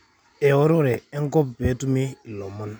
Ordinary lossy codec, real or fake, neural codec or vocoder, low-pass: none; real; none; 19.8 kHz